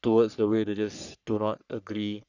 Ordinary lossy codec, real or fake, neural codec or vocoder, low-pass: none; fake; codec, 44.1 kHz, 3.4 kbps, Pupu-Codec; 7.2 kHz